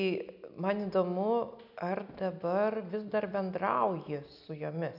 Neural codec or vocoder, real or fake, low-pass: none; real; 5.4 kHz